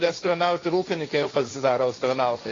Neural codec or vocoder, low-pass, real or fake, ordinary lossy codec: codec, 16 kHz, 1.1 kbps, Voila-Tokenizer; 7.2 kHz; fake; AAC, 32 kbps